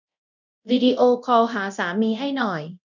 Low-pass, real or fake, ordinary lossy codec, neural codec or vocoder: 7.2 kHz; fake; none; codec, 24 kHz, 0.9 kbps, DualCodec